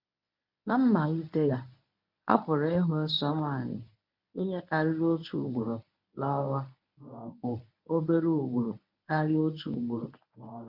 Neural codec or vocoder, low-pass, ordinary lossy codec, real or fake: codec, 24 kHz, 0.9 kbps, WavTokenizer, medium speech release version 1; 5.4 kHz; MP3, 48 kbps; fake